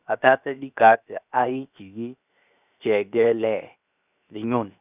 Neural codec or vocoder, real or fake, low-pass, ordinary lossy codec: codec, 16 kHz, 0.7 kbps, FocalCodec; fake; 3.6 kHz; none